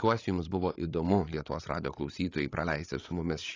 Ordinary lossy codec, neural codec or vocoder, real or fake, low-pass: AAC, 32 kbps; codec, 16 kHz, 8 kbps, FreqCodec, larger model; fake; 7.2 kHz